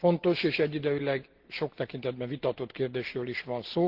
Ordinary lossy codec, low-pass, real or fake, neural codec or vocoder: Opus, 16 kbps; 5.4 kHz; real; none